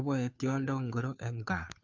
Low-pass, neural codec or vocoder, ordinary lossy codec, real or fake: 7.2 kHz; codec, 16 kHz, 2 kbps, FunCodec, trained on Chinese and English, 25 frames a second; none; fake